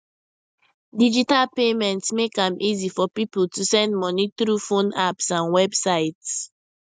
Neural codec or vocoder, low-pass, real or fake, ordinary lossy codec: none; none; real; none